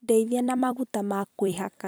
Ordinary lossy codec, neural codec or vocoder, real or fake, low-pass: none; vocoder, 44.1 kHz, 128 mel bands every 256 samples, BigVGAN v2; fake; none